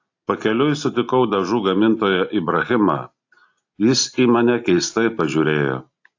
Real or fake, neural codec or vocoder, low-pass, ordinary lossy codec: real; none; 7.2 kHz; AAC, 48 kbps